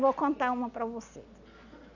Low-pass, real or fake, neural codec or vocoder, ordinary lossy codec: 7.2 kHz; real; none; none